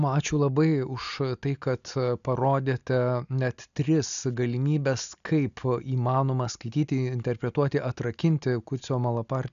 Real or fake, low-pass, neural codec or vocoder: real; 7.2 kHz; none